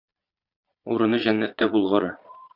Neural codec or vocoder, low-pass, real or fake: vocoder, 22.05 kHz, 80 mel bands, Vocos; 5.4 kHz; fake